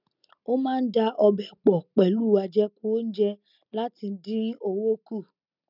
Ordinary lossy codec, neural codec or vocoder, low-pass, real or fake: none; none; 5.4 kHz; real